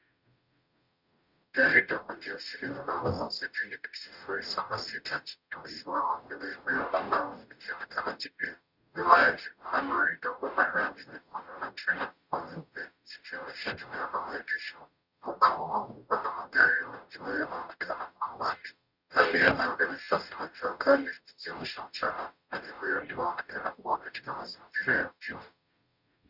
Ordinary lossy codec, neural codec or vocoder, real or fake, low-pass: Opus, 64 kbps; codec, 44.1 kHz, 0.9 kbps, DAC; fake; 5.4 kHz